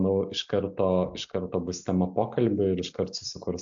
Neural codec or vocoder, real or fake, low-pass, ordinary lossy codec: none; real; 7.2 kHz; AAC, 64 kbps